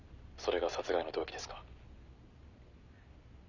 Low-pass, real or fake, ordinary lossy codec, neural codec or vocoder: 7.2 kHz; real; none; none